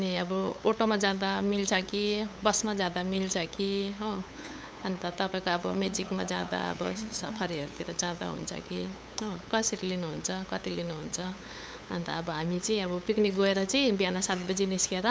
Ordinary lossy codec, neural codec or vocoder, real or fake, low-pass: none; codec, 16 kHz, 8 kbps, FunCodec, trained on LibriTTS, 25 frames a second; fake; none